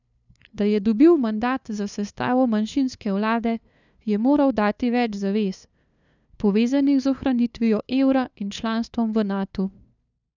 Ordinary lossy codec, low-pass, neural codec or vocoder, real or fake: none; 7.2 kHz; codec, 16 kHz, 2 kbps, FunCodec, trained on LibriTTS, 25 frames a second; fake